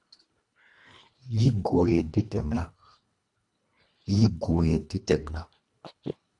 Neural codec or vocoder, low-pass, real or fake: codec, 24 kHz, 1.5 kbps, HILCodec; 10.8 kHz; fake